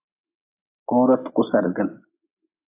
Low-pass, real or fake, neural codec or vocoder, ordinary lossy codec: 3.6 kHz; real; none; AAC, 24 kbps